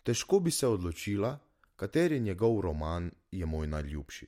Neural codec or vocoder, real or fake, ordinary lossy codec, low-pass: none; real; MP3, 64 kbps; 19.8 kHz